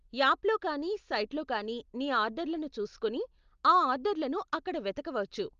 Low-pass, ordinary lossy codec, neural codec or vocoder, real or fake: 7.2 kHz; Opus, 32 kbps; none; real